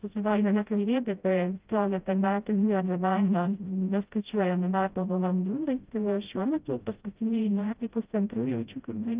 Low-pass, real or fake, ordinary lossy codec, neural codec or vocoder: 3.6 kHz; fake; Opus, 24 kbps; codec, 16 kHz, 0.5 kbps, FreqCodec, smaller model